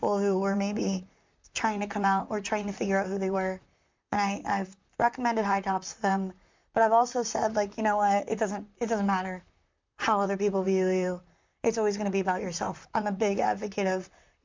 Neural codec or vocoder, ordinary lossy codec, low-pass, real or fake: vocoder, 44.1 kHz, 128 mel bands, Pupu-Vocoder; MP3, 64 kbps; 7.2 kHz; fake